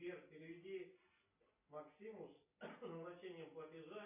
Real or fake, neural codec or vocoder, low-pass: real; none; 3.6 kHz